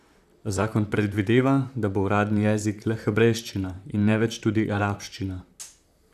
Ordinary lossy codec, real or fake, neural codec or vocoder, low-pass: none; fake; vocoder, 44.1 kHz, 128 mel bands, Pupu-Vocoder; 14.4 kHz